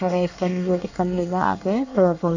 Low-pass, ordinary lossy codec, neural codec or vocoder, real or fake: 7.2 kHz; none; codec, 24 kHz, 1 kbps, SNAC; fake